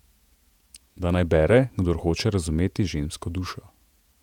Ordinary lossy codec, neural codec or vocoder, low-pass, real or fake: none; vocoder, 48 kHz, 128 mel bands, Vocos; 19.8 kHz; fake